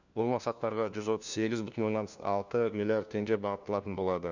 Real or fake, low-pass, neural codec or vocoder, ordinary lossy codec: fake; 7.2 kHz; codec, 16 kHz, 1 kbps, FunCodec, trained on LibriTTS, 50 frames a second; none